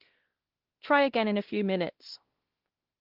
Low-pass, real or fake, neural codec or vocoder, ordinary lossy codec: 5.4 kHz; fake; codec, 16 kHz, 1 kbps, X-Codec, WavLM features, trained on Multilingual LibriSpeech; Opus, 16 kbps